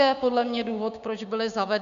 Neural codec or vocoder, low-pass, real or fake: codec, 16 kHz, 6 kbps, DAC; 7.2 kHz; fake